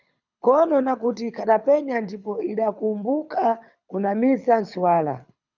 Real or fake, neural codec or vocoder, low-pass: fake; codec, 24 kHz, 6 kbps, HILCodec; 7.2 kHz